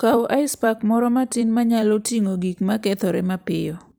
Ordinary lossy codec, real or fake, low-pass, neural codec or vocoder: none; fake; none; vocoder, 44.1 kHz, 128 mel bands every 256 samples, BigVGAN v2